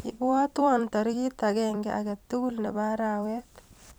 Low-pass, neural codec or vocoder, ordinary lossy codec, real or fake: none; vocoder, 44.1 kHz, 128 mel bands every 256 samples, BigVGAN v2; none; fake